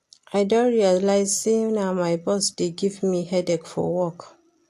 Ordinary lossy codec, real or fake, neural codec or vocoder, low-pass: AAC, 64 kbps; real; none; 14.4 kHz